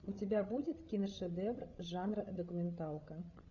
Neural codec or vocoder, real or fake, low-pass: codec, 16 kHz, 16 kbps, FreqCodec, larger model; fake; 7.2 kHz